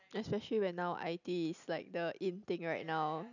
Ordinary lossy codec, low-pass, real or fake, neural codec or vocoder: none; 7.2 kHz; real; none